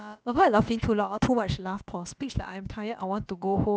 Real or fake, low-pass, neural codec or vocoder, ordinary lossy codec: fake; none; codec, 16 kHz, about 1 kbps, DyCAST, with the encoder's durations; none